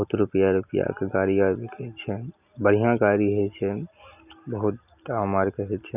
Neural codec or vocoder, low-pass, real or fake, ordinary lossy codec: none; 3.6 kHz; real; none